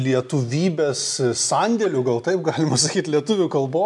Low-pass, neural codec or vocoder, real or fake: 10.8 kHz; none; real